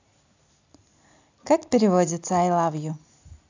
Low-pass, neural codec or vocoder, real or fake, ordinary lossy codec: 7.2 kHz; none; real; AAC, 48 kbps